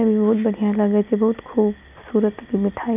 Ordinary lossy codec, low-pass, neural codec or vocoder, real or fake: none; 3.6 kHz; none; real